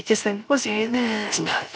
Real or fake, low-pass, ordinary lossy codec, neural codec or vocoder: fake; none; none; codec, 16 kHz, 0.3 kbps, FocalCodec